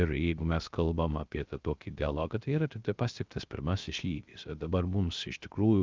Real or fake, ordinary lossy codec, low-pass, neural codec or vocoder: fake; Opus, 24 kbps; 7.2 kHz; codec, 16 kHz, 0.3 kbps, FocalCodec